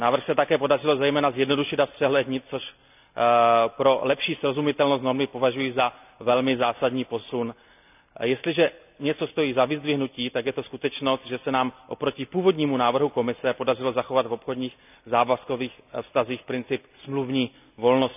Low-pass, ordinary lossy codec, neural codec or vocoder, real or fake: 3.6 kHz; none; none; real